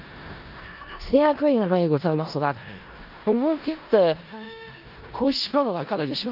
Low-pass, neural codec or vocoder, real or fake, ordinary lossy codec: 5.4 kHz; codec, 16 kHz in and 24 kHz out, 0.4 kbps, LongCat-Audio-Codec, four codebook decoder; fake; Opus, 24 kbps